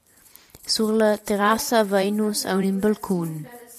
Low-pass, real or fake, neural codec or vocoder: 14.4 kHz; fake; vocoder, 44.1 kHz, 128 mel bands every 512 samples, BigVGAN v2